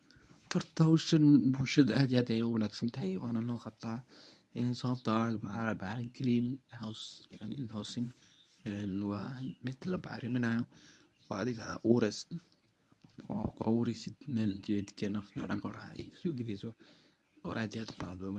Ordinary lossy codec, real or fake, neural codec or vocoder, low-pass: none; fake; codec, 24 kHz, 0.9 kbps, WavTokenizer, medium speech release version 1; none